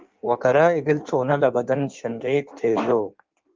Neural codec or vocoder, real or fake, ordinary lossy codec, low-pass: codec, 16 kHz in and 24 kHz out, 1.1 kbps, FireRedTTS-2 codec; fake; Opus, 32 kbps; 7.2 kHz